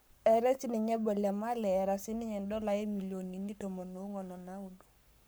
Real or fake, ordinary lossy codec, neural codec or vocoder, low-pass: fake; none; codec, 44.1 kHz, 7.8 kbps, Pupu-Codec; none